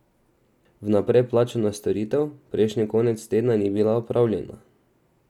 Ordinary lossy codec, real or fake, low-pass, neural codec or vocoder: none; real; 19.8 kHz; none